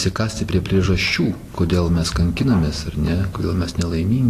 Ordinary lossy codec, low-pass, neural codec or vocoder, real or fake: AAC, 48 kbps; 14.4 kHz; none; real